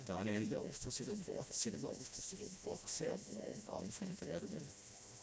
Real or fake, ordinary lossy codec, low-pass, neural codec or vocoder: fake; none; none; codec, 16 kHz, 1 kbps, FreqCodec, smaller model